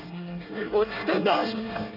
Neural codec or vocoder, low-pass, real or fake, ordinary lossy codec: codec, 24 kHz, 1 kbps, SNAC; 5.4 kHz; fake; none